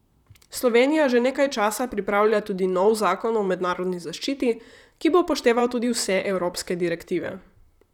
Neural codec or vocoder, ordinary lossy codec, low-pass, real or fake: vocoder, 44.1 kHz, 128 mel bands, Pupu-Vocoder; none; 19.8 kHz; fake